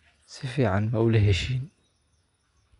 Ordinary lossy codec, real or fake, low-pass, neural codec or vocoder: none; real; 10.8 kHz; none